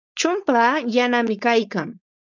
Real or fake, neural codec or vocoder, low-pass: fake; codec, 16 kHz, 4.8 kbps, FACodec; 7.2 kHz